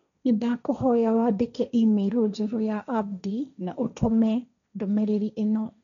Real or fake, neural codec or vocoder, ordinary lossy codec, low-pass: fake; codec, 16 kHz, 1.1 kbps, Voila-Tokenizer; none; 7.2 kHz